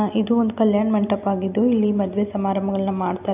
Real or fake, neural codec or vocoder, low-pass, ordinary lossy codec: real; none; 3.6 kHz; none